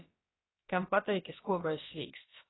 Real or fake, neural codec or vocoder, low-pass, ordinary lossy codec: fake; codec, 16 kHz, about 1 kbps, DyCAST, with the encoder's durations; 7.2 kHz; AAC, 16 kbps